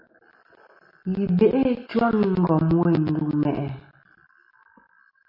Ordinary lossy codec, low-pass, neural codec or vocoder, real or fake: MP3, 32 kbps; 5.4 kHz; none; real